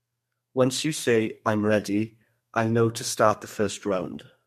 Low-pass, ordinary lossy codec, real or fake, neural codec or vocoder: 14.4 kHz; MP3, 64 kbps; fake; codec, 32 kHz, 1.9 kbps, SNAC